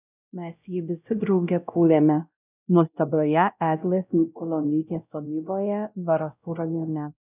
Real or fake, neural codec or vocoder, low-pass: fake; codec, 16 kHz, 0.5 kbps, X-Codec, WavLM features, trained on Multilingual LibriSpeech; 3.6 kHz